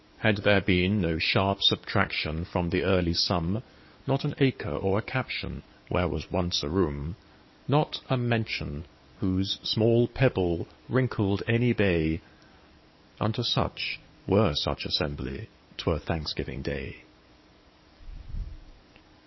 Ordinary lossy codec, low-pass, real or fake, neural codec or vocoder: MP3, 24 kbps; 7.2 kHz; fake; codec, 44.1 kHz, 7.8 kbps, DAC